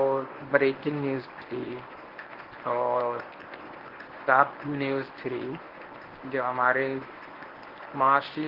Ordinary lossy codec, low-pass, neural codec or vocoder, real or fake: Opus, 16 kbps; 5.4 kHz; codec, 24 kHz, 0.9 kbps, WavTokenizer, medium speech release version 1; fake